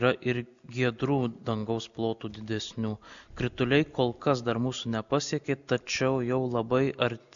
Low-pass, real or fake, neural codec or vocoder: 7.2 kHz; real; none